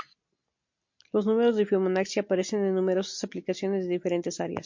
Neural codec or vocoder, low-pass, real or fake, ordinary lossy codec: none; 7.2 kHz; real; MP3, 48 kbps